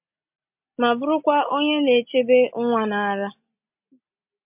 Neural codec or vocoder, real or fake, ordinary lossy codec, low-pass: none; real; MP3, 32 kbps; 3.6 kHz